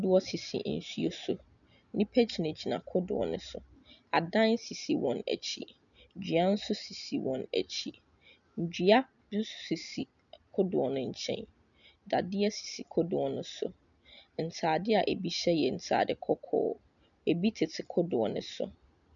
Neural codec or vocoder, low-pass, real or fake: none; 7.2 kHz; real